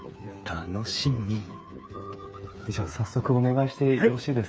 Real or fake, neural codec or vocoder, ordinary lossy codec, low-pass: fake; codec, 16 kHz, 8 kbps, FreqCodec, smaller model; none; none